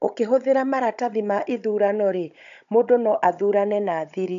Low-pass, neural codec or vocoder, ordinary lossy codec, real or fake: 7.2 kHz; codec, 16 kHz, 16 kbps, FunCodec, trained on Chinese and English, 50 frames a second; none; fake